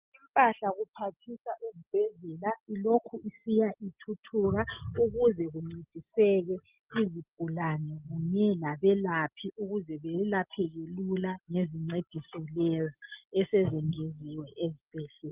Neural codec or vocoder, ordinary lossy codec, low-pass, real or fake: none; Opus, 24 kbps; 3.6 kHz; real